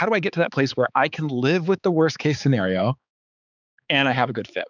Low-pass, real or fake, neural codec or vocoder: 7.2 kHz; fake; codec, 16 kHz, 4 kbps, X-Codec, HuBERT features, trained on balanced general audio